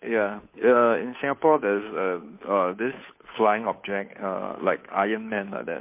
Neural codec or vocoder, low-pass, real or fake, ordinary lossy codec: codec, 16 kHz, 2 kbps, FunCodec, trained on Chinese and English, 25 frames a second; 3.6 kHz; fake; MP3, 24 kbps